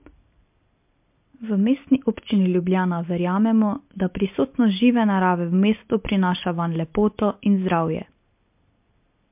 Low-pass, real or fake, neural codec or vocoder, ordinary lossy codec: 3.6 kHz; real; none; MP3, 32 kbps